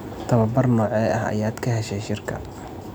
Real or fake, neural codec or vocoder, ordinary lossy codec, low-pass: real; none; none; none